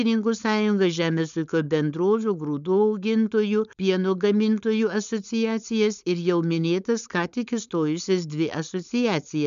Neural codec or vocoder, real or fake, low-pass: codec, 16 kHz, 4.8 kbps, FACodec; fake; 7.2 kHz